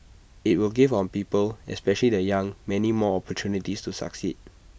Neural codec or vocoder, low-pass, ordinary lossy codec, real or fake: none; none; none; real